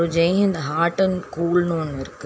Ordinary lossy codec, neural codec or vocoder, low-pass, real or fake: none; none; none; real